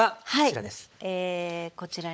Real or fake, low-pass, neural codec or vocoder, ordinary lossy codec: fake; none; codec, 16 kHz, 16 kbps, FunCodec, trained on LibriTTS, 50 frames a second; none